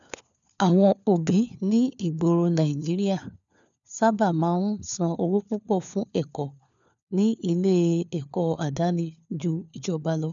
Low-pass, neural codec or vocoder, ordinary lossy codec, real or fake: 7.2 kHz; codec, 16 kHz, 4 kbps, FunCodec, trained on LibriTTS, 50 frames a second; none; fake